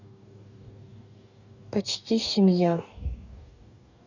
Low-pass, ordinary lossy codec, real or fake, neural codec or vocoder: 7.2 kHz; none; fake; codec, 44.1 kHz, 2.6 kbps, DAC